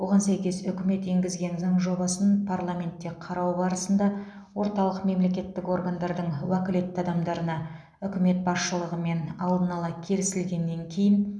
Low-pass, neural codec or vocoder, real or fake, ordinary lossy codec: none; none; real; none